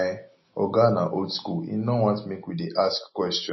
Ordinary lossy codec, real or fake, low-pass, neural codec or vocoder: MP3, 24 kbps; real; 7.2 kHz; none